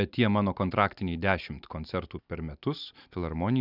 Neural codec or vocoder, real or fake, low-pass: none; real; 5.4 kHz